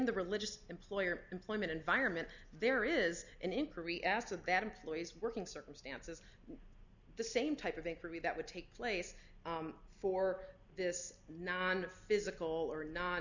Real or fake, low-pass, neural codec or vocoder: real; 7.2 kHz; none